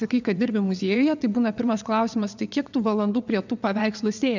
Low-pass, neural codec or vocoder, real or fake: 7.2 kHz; vocoder, 22.05 kHz, 80 mel bands, WaveNeXt; fake